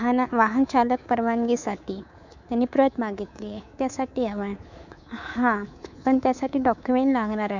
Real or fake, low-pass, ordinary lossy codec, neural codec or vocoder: fake; 7.2 kHz; none; codec, 24 kHz, 3.1 kbps, DualCodec